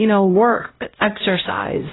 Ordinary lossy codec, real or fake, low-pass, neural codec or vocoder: AAC, 16 kbps; fake; 7.2 kHz; codec, 16 kHz, 0.5 kbps, X-Codec, HuBERT features, trained on LibriSpeech